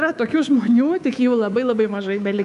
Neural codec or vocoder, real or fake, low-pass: codec, 24 kHz, 3.1 kbps, DualCodec; fake; 10.8 kHz